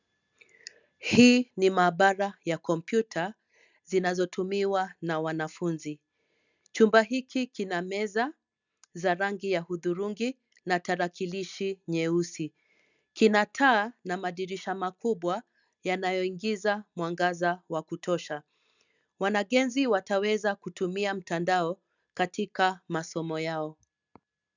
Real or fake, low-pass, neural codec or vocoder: real; 7.2 kHz; none